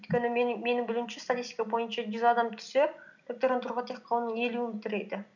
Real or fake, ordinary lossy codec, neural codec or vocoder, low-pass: real; none; none; 7.2 kHz